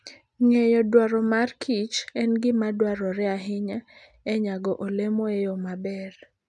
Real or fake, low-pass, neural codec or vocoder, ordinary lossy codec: real; none; none; none